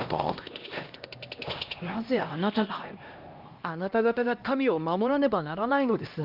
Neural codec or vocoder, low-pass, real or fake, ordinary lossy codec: codec, 16 kHz, 1 kbps, X-Codec, HuBERT features, trained on LibriSpeech; 5.4 kHz; fake; Opus, 24 kbps